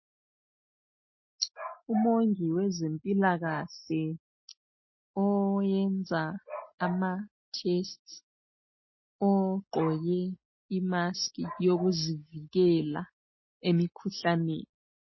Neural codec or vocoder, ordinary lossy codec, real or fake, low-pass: none; MP3, 24 kbps; real; 7.2 kHz